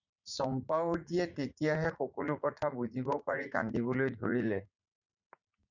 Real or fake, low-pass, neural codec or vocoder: fake; 7.2 kHz; vocoder, 44.1 kHz, 80 mel bands, Vocos